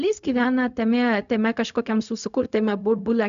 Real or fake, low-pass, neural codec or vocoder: fake; 7.2 kHz; codec, 16 kHz, 0.4 kbps, LongCat-Audio-Codec